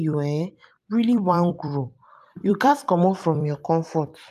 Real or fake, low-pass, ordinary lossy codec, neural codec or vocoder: fake; 14.4 kHz; none; vocoder, 44.1 kHz, 128 mel bands every 256 samples, BigVGAN v2